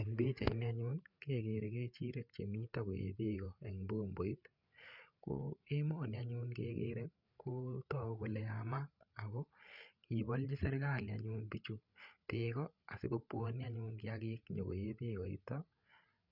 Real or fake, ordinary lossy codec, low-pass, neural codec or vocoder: fake; none; 5.4 kHz; codec, 16 kHz, 8 kbps, FreqCodec, larger model